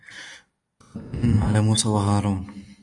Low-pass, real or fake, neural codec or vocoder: 10.8 kHz; real; none